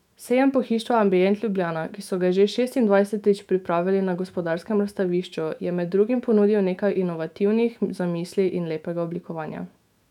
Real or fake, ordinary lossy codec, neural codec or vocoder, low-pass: fake; none; autoencoder, 48 kHz, 128 numbers a frame, DAC-VAE, trained on Japanese speech; 19.8 kHz